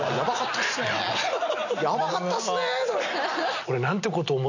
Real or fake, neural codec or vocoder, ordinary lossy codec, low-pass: real; none; none; 7.2 kHz